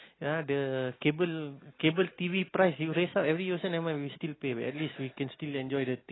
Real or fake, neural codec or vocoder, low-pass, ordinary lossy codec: real; none; 7.2 kHz; AAC, 16 kbps